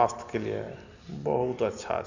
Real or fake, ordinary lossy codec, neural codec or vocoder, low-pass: real; none; none; 7.2 kHz